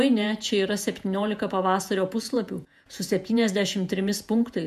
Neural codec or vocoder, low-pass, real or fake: vocoder, 48 kHz, 128 mel bands, Vocos; 14.4 kHz; fake